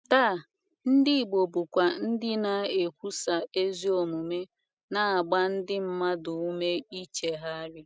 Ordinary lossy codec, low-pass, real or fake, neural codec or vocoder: none; none; real; none